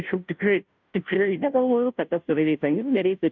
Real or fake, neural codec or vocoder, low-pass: fake; codec, 16 kHz, 0.5 kbps, FunCodec, trained on Chinese and English, 25 frames a second; 7.2 kHz